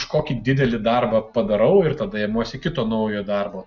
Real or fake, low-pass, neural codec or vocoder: real; 7.2 kHz; none